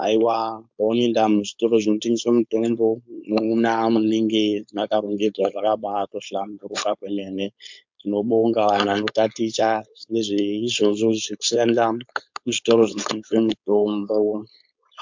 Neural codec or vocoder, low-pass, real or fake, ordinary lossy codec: codec, 16 kHz, 4.8 kbps, FACodec; 7.2 kHz; fake; MP3, 64 kbps